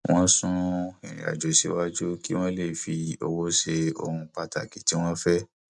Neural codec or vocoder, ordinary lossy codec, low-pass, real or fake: none; none; 10.8 kHz; real